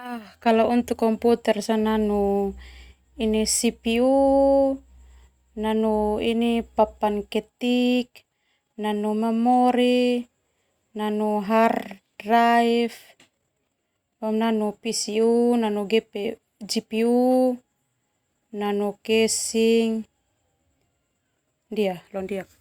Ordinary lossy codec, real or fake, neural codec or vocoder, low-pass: Opus, 64 kbps; real; none; 19.8 kHz